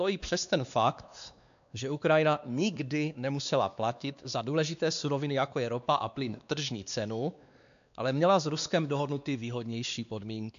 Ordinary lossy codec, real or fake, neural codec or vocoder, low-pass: AAC, 96 kbps; fake; codec, 16 kHz, 2 kbps, X-Codec, WavLM features, trained on Multilingual LibriSpeech; 7.2 kHz